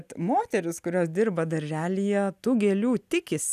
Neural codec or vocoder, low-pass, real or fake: none; 14.4 kHz; real